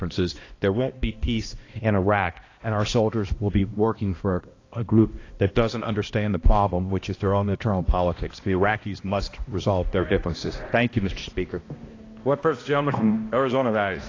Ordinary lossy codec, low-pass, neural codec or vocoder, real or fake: AAC, 32 kbps; 7.2 kHz; codec, 16 kHz, 1 kbps, X-Codec, HuBERT features, trained on balanced general audio; fake